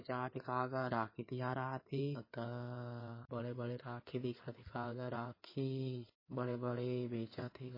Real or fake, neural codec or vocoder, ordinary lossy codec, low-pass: fake; codec, 16 kHz in and 24 kHz out, 2.2 kbps, FireRedTTS-2 codec; MP3, 24 kbps; 5.4 kHz